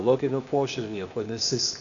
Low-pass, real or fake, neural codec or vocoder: 7.2 kHz; fake; codec, 16 kHz, 0.8 kbps, ZipCodec